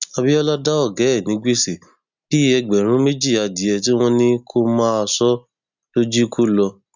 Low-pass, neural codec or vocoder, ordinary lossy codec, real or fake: 7.2 kHz; none; none; real